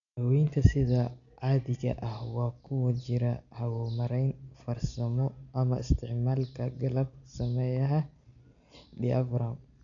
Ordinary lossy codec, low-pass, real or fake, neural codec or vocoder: none; 7.2 kHz; real; none